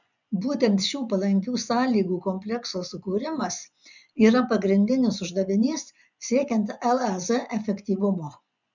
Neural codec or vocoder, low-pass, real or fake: vocoder, 24 kHz, 100 mel bands, Vocos; 7.2 kHz; fake